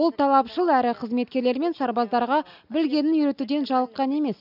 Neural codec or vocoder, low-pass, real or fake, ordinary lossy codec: none; 5.4 kHz; real; none